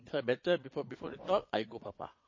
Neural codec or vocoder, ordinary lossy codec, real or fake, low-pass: vocoder, 22.05 kHz, 80 mel bands, Vocos; MP3, 32 kbps; fake; 7.2 kHz